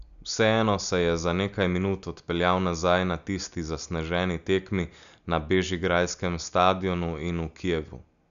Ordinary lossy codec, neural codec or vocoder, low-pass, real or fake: none; none; 7.2 kHz; real